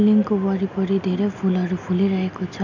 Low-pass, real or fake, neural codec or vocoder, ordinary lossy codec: 7.2 kHz; real; none; none